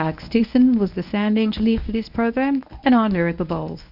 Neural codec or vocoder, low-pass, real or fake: codec, 24 kHz, 0.9 kbps, WavTokenizer, medium speech release version 1; 5.4 kHz; fake